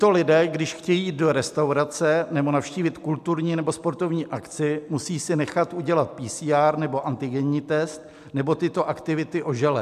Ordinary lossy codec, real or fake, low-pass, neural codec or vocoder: MP3, 96 kbps; real; 14.4 kHz; none